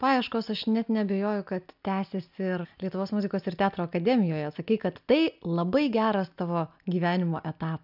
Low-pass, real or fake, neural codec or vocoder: 5.4 kHz; real; none